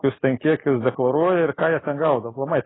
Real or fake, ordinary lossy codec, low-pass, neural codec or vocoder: real; AAC, 16 kbps; 7.2 kHz; none